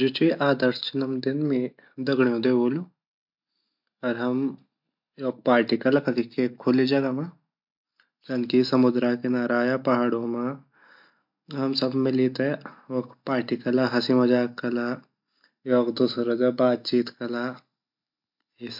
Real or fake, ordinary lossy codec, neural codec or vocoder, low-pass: real; MP3, 48 kbps; none; 5.4 kHz